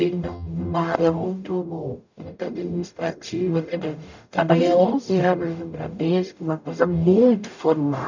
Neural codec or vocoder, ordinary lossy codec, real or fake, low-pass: codec, 44.1 kHz, 0.9 kbps, DAC; none; fake; 7.2 kHz